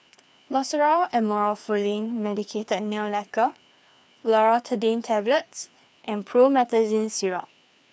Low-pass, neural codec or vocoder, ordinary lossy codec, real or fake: none; codec, 16 kHz, 2 kbps, FreqCodec, larger model; none; fake